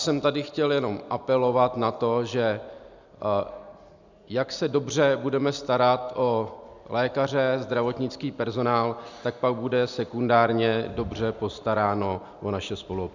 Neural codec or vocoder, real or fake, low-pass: none; real; 7.2 kHz